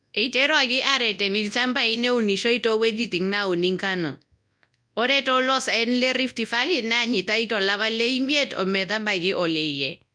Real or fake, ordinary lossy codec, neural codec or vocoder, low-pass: fake; none; codec, 24 kHz, 0.9 kbps, WavTokenizer, large speech release; 9.9 kHz